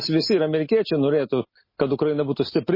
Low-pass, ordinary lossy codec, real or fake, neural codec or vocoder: 5.4 kHz; MP3, 24 kbps; real; none